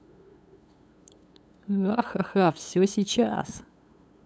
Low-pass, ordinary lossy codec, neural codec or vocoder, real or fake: none; none; codec, 16 kHz, 8 kbps, FunCodec, trained on LibriTTS, 25 frames a second; fake